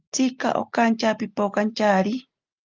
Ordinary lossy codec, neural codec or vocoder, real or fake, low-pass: Opus, 32 kbps; none; real; 7.2 kHz